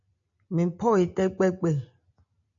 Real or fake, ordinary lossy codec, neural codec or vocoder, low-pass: real; AAC, 64 kbps; none; 7.2 kHz